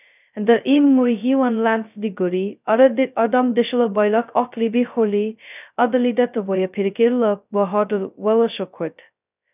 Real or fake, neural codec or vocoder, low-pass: fake; codec, 16 kHz, 0.2 kbps, FocalCodec; 3.6 kHz